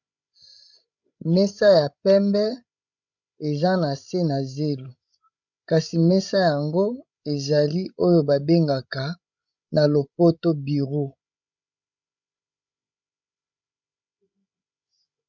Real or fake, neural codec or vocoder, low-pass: fake; codec, 16 kHz, 16 kbps, FreqCodec, larger model; 7.2 kHz